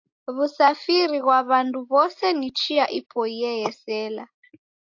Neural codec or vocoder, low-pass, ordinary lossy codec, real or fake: none; 7.2 kHz; AAC, 48 kbps; real